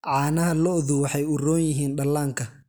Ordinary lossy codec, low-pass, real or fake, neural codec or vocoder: none; none; real; none